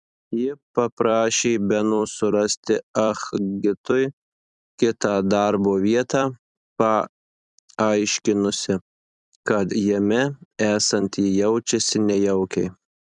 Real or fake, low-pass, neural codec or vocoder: fake; 10.8 kHz; vocoder, 44.1 kHz, 128 mel bands every 256 samples, BigVGAN v2